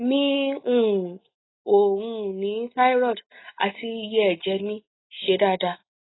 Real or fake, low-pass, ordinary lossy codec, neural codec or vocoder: real; 7.2 kHz; AAC, 16 kbps; none